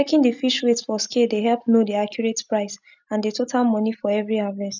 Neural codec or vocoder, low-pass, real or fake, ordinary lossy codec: none; 7.2 kHz; real; none